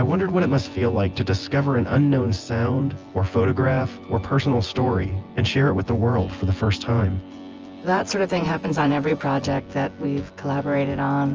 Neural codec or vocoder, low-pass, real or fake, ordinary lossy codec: vocoder, 24 kHz, 100 mel bands, Vocos; 7.2 kHz; fake; Opus, 24 kbps